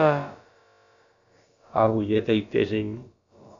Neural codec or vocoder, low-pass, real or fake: codec, 16 kHz, about 1 kbps, DyCAST, with the encoder's durations; 7.2 kHz; fake